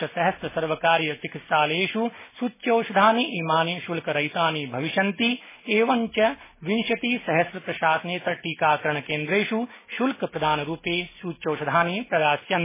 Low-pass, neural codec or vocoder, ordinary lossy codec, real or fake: 3.6 kHz; none; MP3, 16 kbps; real